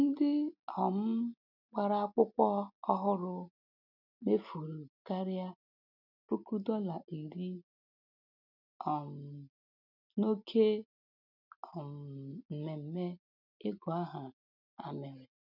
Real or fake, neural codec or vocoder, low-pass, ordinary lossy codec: real; none; 5.4 kHz; none